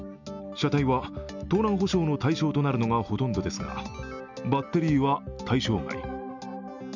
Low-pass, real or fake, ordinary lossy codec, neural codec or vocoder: 7.2 kHz; real; none; none